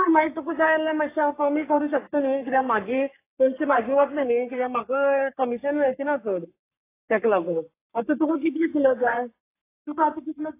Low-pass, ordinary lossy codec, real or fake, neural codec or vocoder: 3.6 kHz; AAC, 24 kbps; fake; codec, 44.1 kHz, 3.4 kbps, Pupu-Codec